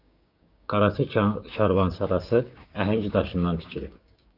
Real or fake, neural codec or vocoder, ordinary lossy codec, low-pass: fake; codec, 16 kHz, 6 kbps, DAC; Opus, 64 kbps; 5.4 kHz